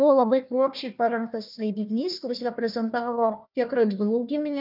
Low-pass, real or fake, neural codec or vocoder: 5.4 kHz; fake; codec, 16 kHz, 1 kbps, FunCodec, trained on Chinese and English, 50 frames a second